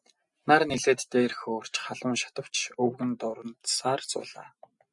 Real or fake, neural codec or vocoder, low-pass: real; none; 10.8 kHz